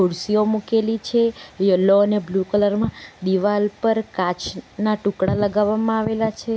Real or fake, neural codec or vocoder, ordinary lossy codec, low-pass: real; none; none; none